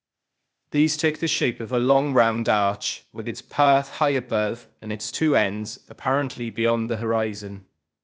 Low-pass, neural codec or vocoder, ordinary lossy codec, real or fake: none; codec, 16 kHz, 0.8 kbps, ZipCodec; none; fake